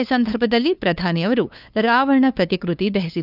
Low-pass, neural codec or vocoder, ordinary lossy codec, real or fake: 5.4 kHz; codec, 16 kHz, 2 kbps, FunCodec, trained on LibriTTS, 25 frames a second; none; fake